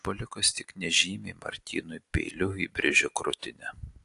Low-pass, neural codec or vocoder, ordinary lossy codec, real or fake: 10.8 kHz; none; AAC, 48 kbps; real